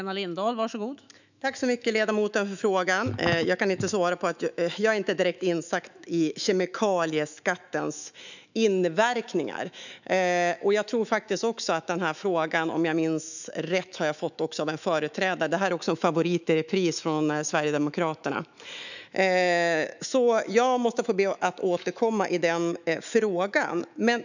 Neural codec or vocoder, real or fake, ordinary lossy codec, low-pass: autoencoder, 48 kHz, 128 numbers a frame, DAC-VAE, trained on Japanese speech; fake; none; 7.2 kHz